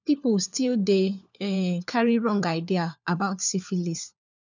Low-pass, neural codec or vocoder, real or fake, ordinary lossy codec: 7.2 kHz; codec, 16 kHz, 4 kbps, FunCodec, trained on LibriTTS, 50 frames a second; fake; none